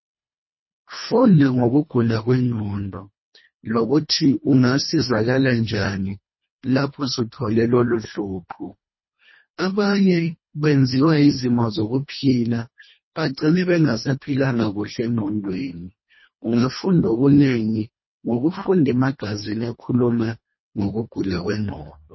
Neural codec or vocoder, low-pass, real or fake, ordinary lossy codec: codec, 24 kHz, 1.5 kbps, HILCodec; 7.2 kHz; fake; MP3, 24 kbps